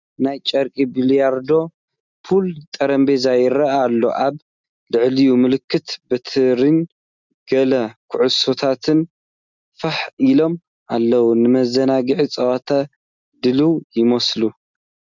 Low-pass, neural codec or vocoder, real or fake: 7.2 kHz; none; real